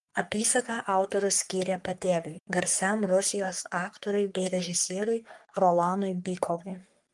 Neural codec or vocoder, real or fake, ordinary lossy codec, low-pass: codec, 44.1 kHz, 3.4 kbps, Pupu-Codec; fake; Opus, 32 kbps; 10.8 kHz